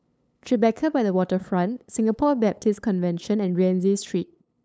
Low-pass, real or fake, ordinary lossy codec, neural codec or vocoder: none; fake; none; codec, 16 kHz, 8 kbps, FunCodec, trained on LibriTTS, 25 frames a second